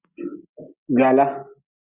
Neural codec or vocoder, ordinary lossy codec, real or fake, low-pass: none; Opus, 64 kbps; real; 3.6 kHz